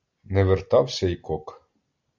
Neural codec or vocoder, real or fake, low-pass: none; real; 7.2 kHz